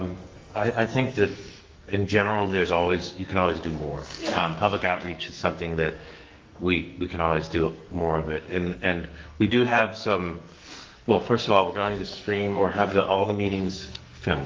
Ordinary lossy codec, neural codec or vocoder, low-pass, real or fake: Opus, 32 kbps; codec, 44.1 kHz, 2.6 kbps, SNAC; 7.2 kHz; fake